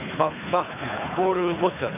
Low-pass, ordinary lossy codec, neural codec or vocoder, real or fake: 3.6 kHz; none; codec, 24 kHz, 3 kbps, HILCodec; fake